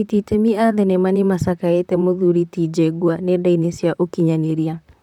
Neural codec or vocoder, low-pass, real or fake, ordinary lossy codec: vocoder, 44.1 kHz, 128 mel bands, Pupu-Vocoder; 19.8 kHz; fake; none